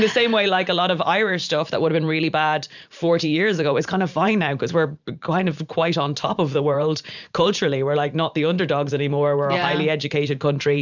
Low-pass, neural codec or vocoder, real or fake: 7.2 kHz; vocoder, 44.1 kHz, 128 mel bands every 512 samples, BigVGAN v2; fake